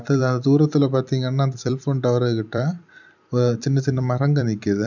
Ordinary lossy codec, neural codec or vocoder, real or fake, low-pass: none; vocoder, 44.1 kHz, 128 mel bands every 256 samples, BigVGAN v2; fake; 7.2 kHz